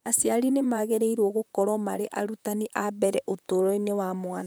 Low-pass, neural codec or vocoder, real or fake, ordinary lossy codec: none; vocoder, 44.1 kHz, 128 mel bands, Pupu-Vocoder; fake; none